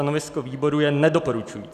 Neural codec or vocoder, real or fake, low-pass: none; real; 14.4 kHz